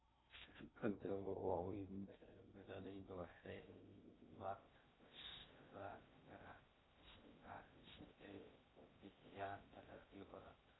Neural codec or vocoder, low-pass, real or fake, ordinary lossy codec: codec, 16 kHz in and 24 kHz out, 0.6 kbps, FocalCodec, streaming, 2048 codes; 7.2 kHz; fake; AAC, 16 kbps